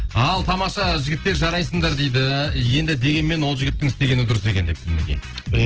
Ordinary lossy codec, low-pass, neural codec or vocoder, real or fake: Opus, 16 kbps; 7.2 kHz; none; real